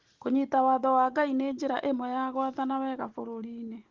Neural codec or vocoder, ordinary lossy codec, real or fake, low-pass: none; Opus, 16 kbps; real; 7.2 kHz